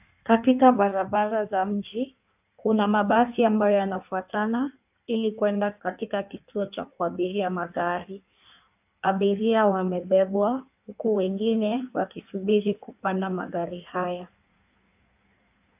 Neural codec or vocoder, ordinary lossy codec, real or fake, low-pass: codec, 16 kHz in and 24 kHz out, 1.1 kbps, FireRedTTS-2 codec; AAC, 32 kbps; fake; 3.6 kHz